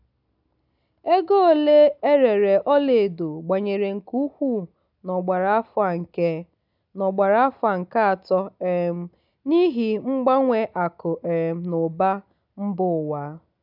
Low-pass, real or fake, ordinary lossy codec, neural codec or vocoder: 5.4 kHz; real; none; none